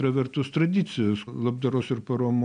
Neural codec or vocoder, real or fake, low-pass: none; real; 9.9 kHz